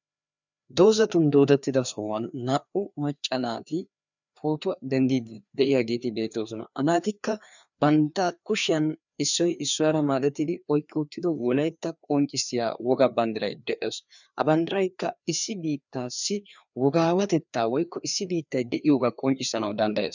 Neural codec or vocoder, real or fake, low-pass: codec, 16 kHz, 2 kbps, FreqCodec, larger model; fake; 7.2 kHz